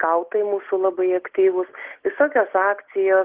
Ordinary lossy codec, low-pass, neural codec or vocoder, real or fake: Opus, 16 kbps; 3.6 kHz; none; real